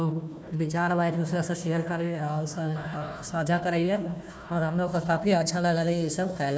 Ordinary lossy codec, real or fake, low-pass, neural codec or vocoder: none; fake; none; codec, 16 kHz, 1 kbps, FunCodec, trained on Chinese and English, 50 frames a second